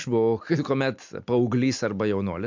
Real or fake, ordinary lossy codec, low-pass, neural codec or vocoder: real; MP3, 64 kbps; 7.2 kHz; none